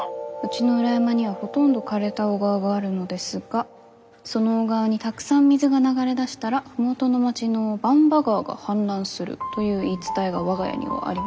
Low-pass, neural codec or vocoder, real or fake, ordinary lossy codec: none; none; real; none